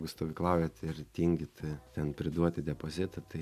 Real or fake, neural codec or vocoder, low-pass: real; none; 14.4 kHz